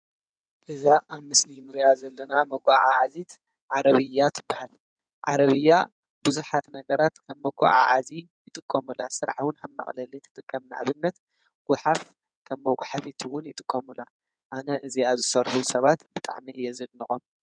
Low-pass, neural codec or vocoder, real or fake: 9.9 kHz; codec, 16 kHz in and 24 kHz out, 2.2 kbps, FireRedTTS-2 codec; fake